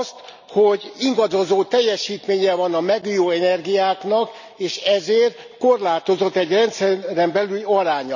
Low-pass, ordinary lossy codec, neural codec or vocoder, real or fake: 7.2 kHz; none; none; real